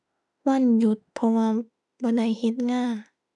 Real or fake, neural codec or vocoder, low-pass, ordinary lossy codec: fake; autoencoder, 48 kHz, 32 numbers a frame, DAC-VAE, trained on Japanese speech; 10.8 kHz; none